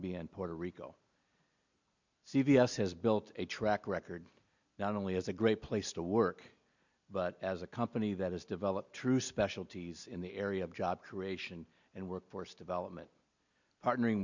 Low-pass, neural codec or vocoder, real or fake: 7.2 kHz; none; real